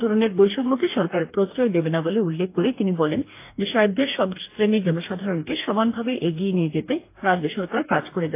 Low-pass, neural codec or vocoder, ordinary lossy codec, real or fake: 3.6 kHz; codec, 44.1 kHz, 2.6 kbps, DAC; AAC, 24 kbps; fake